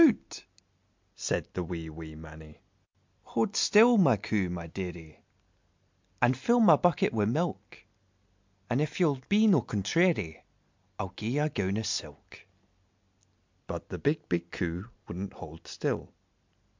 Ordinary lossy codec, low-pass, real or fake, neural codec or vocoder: MP3, 64 kbps; 7.2 kHz; real; none